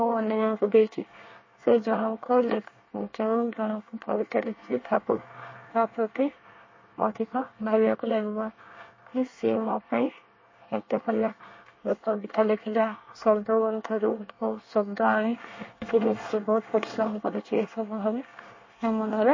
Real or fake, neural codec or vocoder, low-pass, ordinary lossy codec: fake; codec, 24 kHz, 1 kbps, SNAC; 7.2 kHz; MP3, 32 kbps